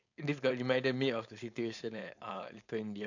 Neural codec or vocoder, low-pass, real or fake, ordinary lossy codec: codec, 16 kHz, 4.8 kbps, FACodec; 7.2 kHz; fake; none